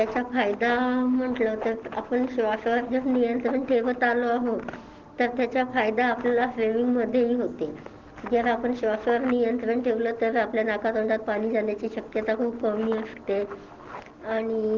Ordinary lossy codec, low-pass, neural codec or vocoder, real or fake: Opus, 16 kbps; 7.2 kHz; none; real